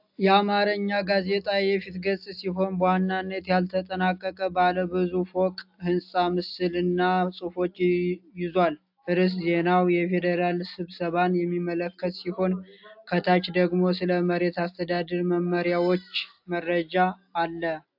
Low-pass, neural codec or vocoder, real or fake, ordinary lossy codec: 5.4 kHz; none; real; AAC, 48 kbps